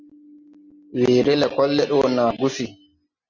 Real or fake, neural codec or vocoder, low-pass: real; none; 7.2 kHz